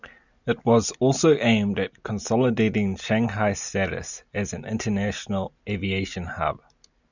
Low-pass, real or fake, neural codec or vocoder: 7.2 kHz; real; none